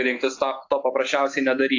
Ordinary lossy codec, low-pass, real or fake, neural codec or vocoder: AAC, 48 kbps; 7.2 kHz; real; none